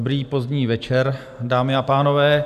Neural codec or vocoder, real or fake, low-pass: none; real; 14.4 kHz